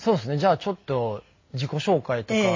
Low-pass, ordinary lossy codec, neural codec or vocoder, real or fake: 7.2 kHz; MP3, 32 kbps; none; real